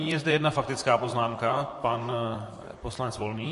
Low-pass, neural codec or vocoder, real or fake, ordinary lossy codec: 14.4 kHz; vocoder, 44.1 kHz, 128 mel bands, Pupu-Vocoder; fake; MP3, 48 kbps